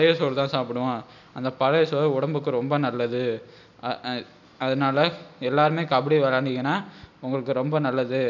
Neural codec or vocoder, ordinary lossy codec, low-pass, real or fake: none; none; 7.2 kHz; real